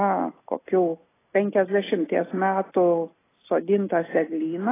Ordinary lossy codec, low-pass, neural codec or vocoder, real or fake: AAC, 16 kbps; 3.6 kHz; none; real